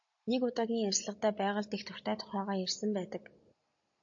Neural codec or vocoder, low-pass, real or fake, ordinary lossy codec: none; 7.2 kHz; real; AAC, 64 kbps